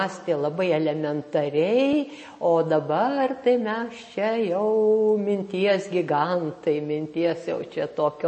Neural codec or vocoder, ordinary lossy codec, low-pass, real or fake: none; MP3, 32 kbps; 9.9 kHz; real